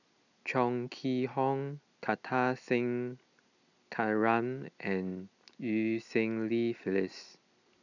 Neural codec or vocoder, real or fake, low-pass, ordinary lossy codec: none; real; 7.2 kHz; none